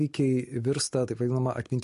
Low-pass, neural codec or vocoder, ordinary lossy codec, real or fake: 14.4 kHz; none; MP3, 48 kbps; real